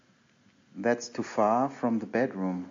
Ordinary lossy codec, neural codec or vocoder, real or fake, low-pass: AAC, 48 kbps; none; real; 7.2 kHz